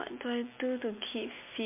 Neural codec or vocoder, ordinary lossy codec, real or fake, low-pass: none; MP3, 32 kbps; real; 3.6 kHz